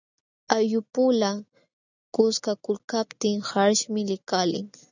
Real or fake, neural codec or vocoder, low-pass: real; none; 7.2 kHz